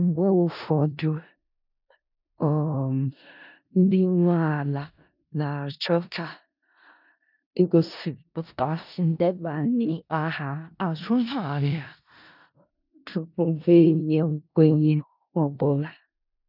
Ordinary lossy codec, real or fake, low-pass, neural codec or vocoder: none; fake; 5.4 kHz; codec, 16 kHz in and 24 kHz out, 0.4 kbps, LongCat-Audio-Codec, four codebook decoder